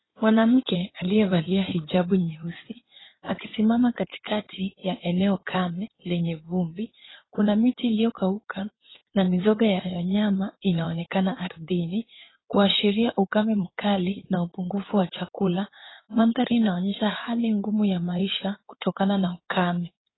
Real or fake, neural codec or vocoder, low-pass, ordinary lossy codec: fake; codec, 16 kHz in and 24 kHz out, 2.2 kbps, FireRedTTS-2 codec; 7.2 kHz; AAC, 16 kbps